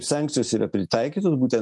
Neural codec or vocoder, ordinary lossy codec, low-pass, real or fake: none; MP3, 64 kbps; 10.8 kHz; real